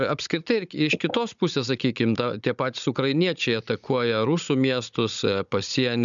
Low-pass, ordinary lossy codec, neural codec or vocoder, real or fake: 7.2 kHz; MP3, 96 kbps; codec, 16 kHz, 16 kbps, FunCodec, trained on Chinese and English, 50 frames a second; fake